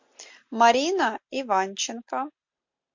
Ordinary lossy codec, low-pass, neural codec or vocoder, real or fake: MP3, 48 kbps; 7.2 kHz; none; real